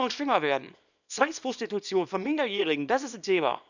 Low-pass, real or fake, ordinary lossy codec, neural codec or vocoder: 7.2 kHz; fake; none; codec, 24 kHz, 0.9 kbps, WavTokenizer, small release